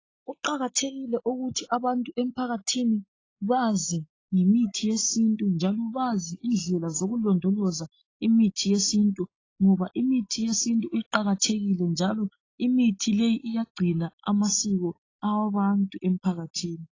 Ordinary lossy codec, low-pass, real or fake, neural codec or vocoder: AAC, 32 kbps; 7.2 kHz; real; none